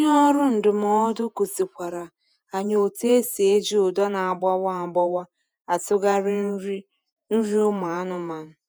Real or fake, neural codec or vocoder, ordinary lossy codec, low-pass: fake; vocoder, 48 kHz, 128 mel bands, Vocos; none; none